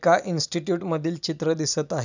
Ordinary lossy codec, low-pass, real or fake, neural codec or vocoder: none; 7.2 kHz; real; none